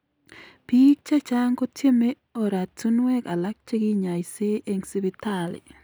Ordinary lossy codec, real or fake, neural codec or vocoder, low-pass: none; real; none; none